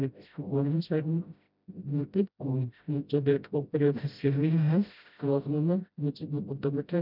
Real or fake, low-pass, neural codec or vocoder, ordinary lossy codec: fake; 5.4 kHz; codec, 16 kHz, 0.5 kbps, FreqCodec, smaller model; none